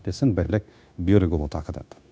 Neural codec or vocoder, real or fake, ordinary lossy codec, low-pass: codec, 16 kHz, 0.9 kbps, LongCat-Audio-Codec; fake; none; none